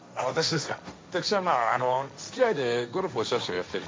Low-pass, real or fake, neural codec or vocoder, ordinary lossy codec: none; fake; codec, 16 kHz, 1.1 kbps, Voila-Tokenizer; none